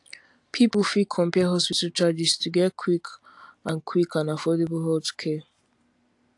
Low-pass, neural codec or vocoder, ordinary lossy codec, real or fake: 10.8 kHz; none; AAC, 64 kbps; real